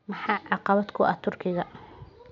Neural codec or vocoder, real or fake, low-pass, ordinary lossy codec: none; real; 7.2 kHz; MP3, 64 kbps